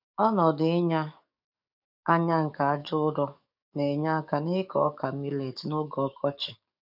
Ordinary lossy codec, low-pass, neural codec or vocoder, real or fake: none; 5.4 kHz; codec, 44.1 kHz, 7.8 kbps, DAC; fake